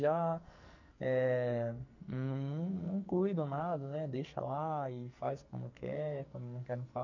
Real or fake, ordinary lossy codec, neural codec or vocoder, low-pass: fake; none; codec, 44.1 kHz, 2.6 kbps, SNAC; 7.2 kHz